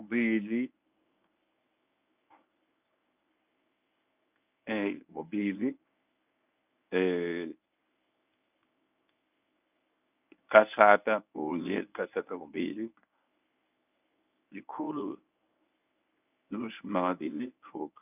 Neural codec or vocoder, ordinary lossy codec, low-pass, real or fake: codec, 24 kHz, 0.9 kbps, WavTokenizer, medium speech release version 1; none; 3.6 kHz; fake